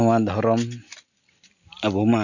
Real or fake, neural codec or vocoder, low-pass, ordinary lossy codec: real; none; 7.2 kHz; none